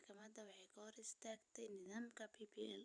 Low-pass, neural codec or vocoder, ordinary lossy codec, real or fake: 9.9 kHz; vocoder, 44.1 kHz, 128 mel bands every 512 samples, BigVGAN v2; none; fake